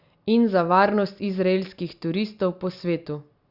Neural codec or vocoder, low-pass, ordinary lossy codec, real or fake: none; 5.4 kHz; Opus, 64 kbps; real